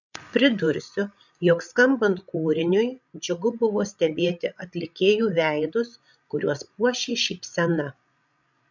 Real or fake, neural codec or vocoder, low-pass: fake; codec, 16 kHz, 16 kbps, FreqCodec, larger model; 7.2 kHz